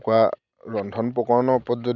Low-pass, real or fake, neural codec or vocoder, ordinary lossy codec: 7.2 kHz; real; none; none